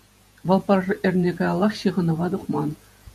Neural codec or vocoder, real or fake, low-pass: vocoder, 48 kHz, 128 mel bands, Vocos; fake; 14.4 kHz